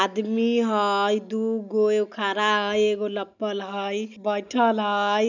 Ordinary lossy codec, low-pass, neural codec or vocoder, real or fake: none; 7.2 kHz; none; real